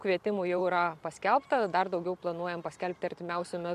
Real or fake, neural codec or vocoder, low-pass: fake; vocoder, 44.1 kHz, 128 mel bands every 512 samples, BigVGAN v2; 14.4 kHz